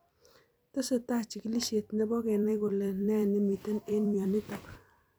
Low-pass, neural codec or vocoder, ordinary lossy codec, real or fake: none; vocoder, 44.1 kHz, 128 mel bands every 256 samples, BigVGAN v2; none; fake